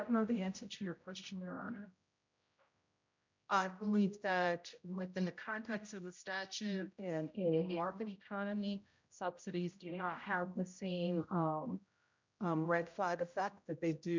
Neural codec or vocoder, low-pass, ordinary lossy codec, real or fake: codec, 16 kHz, 0.5 kbps, X-Codec, HuBERT features, trained on general audio; 7.2 kHz; MP3, 64 kbps; fake